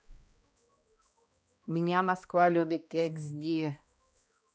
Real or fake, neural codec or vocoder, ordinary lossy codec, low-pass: fake; codec, 16 kHz, 1 kbps, X-Codec, HuBERT features, trained on balanced general audio; none; none